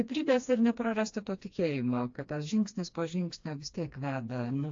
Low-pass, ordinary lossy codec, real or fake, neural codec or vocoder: 7.2 kHz; AAC, 48 kbps; fake; codec, 16 kHz, 2 kbps, FreqCodec, smaller model